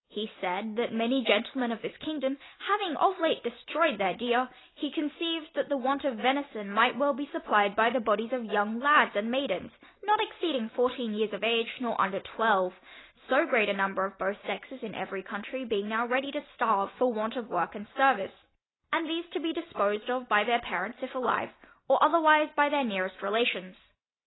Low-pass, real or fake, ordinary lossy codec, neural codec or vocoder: 7.2 kHz; real; AAC, 16 kbps; none